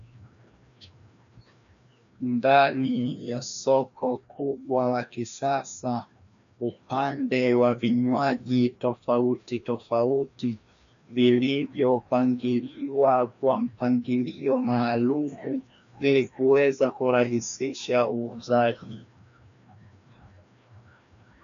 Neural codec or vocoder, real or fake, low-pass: codec, 16 kHz, 1 kbps, FreqCodec, larger model; fake; 7.2 kHz